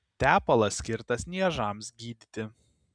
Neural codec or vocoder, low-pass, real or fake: none; 9.9 kHz; real